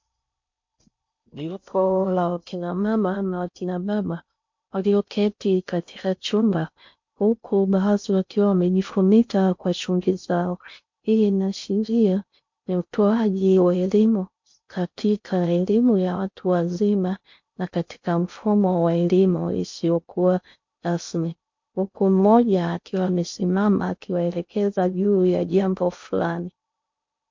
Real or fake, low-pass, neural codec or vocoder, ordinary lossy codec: fake; 7.2 kHz; codec, 16 kHz in and 24 kHz out, 0.6 kbps, FocalCodec, streaming, 4096 codes; MP3, 48 kbps